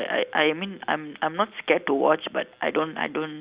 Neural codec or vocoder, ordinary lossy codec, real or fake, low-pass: none; Opus, 64 kbps; real; 3.6 kHz